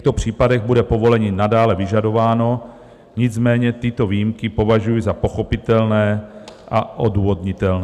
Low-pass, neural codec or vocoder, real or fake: 14.4 kHz; none; real